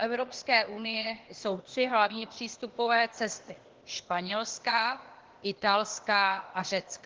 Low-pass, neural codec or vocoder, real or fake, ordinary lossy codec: 7.2 kHz; codec, 16 kHz, 0.8 kbps, ZipCodec; fake; Opus, 16 kbps